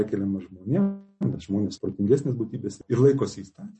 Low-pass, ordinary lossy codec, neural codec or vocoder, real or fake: 10.8 kHz; MP3, 32 kbps; none; real